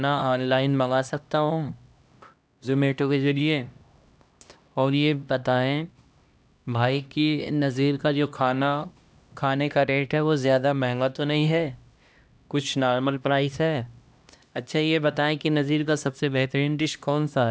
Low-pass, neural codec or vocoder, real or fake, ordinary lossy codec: none; codec, 16 kHz, 1 kbps, X-Codec, HuBERT features, trained on LibriSpeech; fake; none